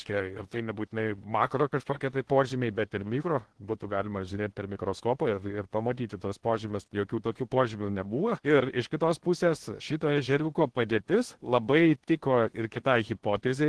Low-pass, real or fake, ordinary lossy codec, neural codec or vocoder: 10.8 kHz; fake; Opus, 16 kbps; codec, 16 kHz in and 24 kHz out, 0.8 kbps, FocalCodec, streaming, 65536 codes